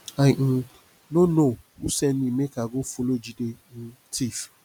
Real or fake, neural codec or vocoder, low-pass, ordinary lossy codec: real; none; 19.8 kHz; none